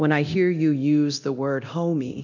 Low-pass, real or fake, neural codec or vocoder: 7.2 kHz; fake; codec, 24 kHz, 0.9 kbps, DualCodec